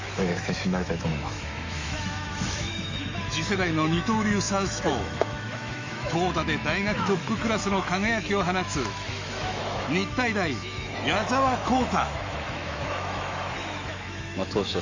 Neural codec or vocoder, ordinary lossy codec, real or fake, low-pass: autoencoder, 48 kHz, 128 numbers a frame, DAC-VAE, trained on Japanese speech; MP3, 48 kbps; fake; 7.2 kHz